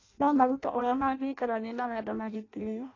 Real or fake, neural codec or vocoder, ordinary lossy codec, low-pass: fake; codec, 16 kHz in and 24 kHz out, 0.6 kbps, FireRedTTS-2 codec; AAC, 32 kbps; 7.2 kHz